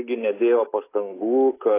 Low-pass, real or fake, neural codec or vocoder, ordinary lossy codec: 3.6 kHz; real; none; AAC, 16 kbps